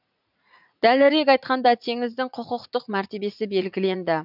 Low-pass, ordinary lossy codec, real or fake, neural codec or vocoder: 5.4 kHz; none; fake; vocoder, 44.1 kHz, 80 mel bands, Vocos